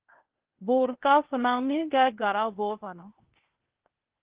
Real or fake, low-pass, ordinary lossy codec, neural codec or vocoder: fake; 3.6 kHz; Opus, 16 kbps; codec, 16 kHz, 0.8 kbps, ZipCodec